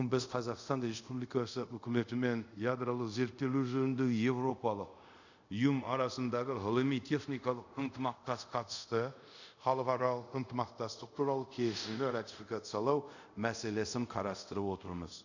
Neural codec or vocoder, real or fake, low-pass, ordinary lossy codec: codec, 24 kHz, 0.5 kbps, DualCodec; fake; 7.2 kHz; none